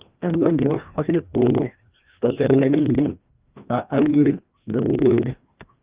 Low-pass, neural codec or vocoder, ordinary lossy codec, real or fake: 3.6 kHz; codec, 16 kHz, 1 kbps, FreqCodec, larger model; Opus, 32 kbps; fake